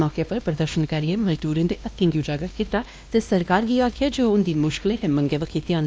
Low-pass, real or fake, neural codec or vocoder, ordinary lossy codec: none; fake; codec, 16 kHz, 1 kbps, X-Codec, WavLM features, trained on Multilingual LibriSpeech; none